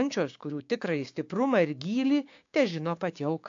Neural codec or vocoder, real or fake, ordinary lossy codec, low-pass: codec, 16 kHz, 6 kbps, DAC; fake; AAC, 48 kbps; 7.2 kHz